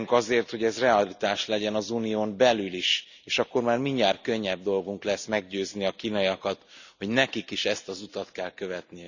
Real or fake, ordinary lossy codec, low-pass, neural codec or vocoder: real; none; 7.2 kHz; none